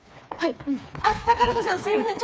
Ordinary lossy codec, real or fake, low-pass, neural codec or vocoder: none; fake; none; codec, 16 kHz, 4 kbps, FreqCodec, smaller model